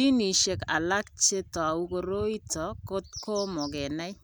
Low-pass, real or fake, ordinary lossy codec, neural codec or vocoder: none; real; none; none